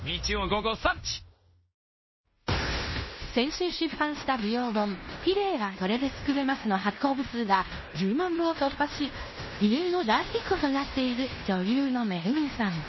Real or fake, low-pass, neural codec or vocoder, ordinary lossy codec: fake; 7.2 kHz; codec, 16 kHz in and 24 kHz out, 0.9 kbps, LongCat-Audio-Codec, fine tuned four codebook decoder; MP3, 24 kbps